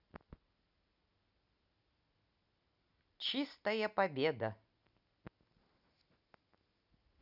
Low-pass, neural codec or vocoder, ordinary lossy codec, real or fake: 5.4 kHz; none; none; real